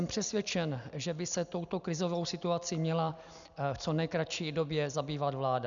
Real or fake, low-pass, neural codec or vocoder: real; 7.2 kHz; none